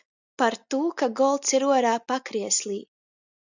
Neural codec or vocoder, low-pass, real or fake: none; 7.2 kHz; real